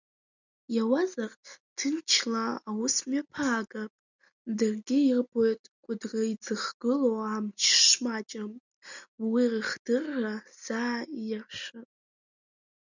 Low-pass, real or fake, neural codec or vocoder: 7.2 kHz; real; none